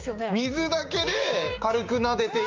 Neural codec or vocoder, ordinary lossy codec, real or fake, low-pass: codec, 16 kHz, 6 kbps, DAC; Opus, 32 kbps; fake; 7.2 kHz